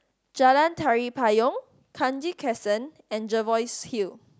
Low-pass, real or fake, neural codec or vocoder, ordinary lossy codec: none; real; none; none